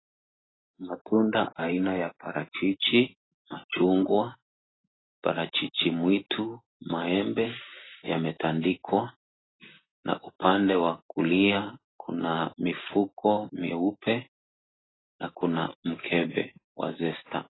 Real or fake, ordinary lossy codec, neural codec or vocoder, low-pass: real; AAC, 16 kbps; none; 7.2 kHz